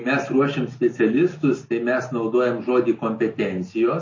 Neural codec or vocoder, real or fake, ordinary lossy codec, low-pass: none; real; MP3, 32 kbps; 7.2 kHz